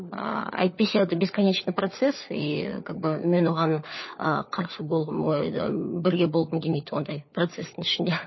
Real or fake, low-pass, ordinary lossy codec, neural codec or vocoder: fake; 7.2 kHz; MP3, 24 kbps; codec, 16 kHz, 4 kbps, FunCodec, trained on LibriTTS, 50 frames a second